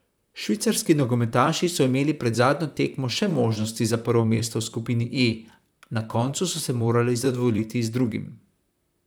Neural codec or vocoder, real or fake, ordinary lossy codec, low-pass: vocoder, 44.1 kHz, 128 mel bands, Pupu-Vocoder; fake; none; none